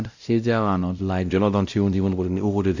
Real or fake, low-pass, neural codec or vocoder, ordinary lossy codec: fake; 7.2 kHz; codec, 16 kHz, 0.5 kbps, X-Codec, WavLM features, trained on Multilingual LibriSpeech; none